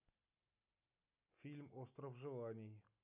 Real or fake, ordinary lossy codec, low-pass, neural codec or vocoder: real; none; 3.6 kHz; none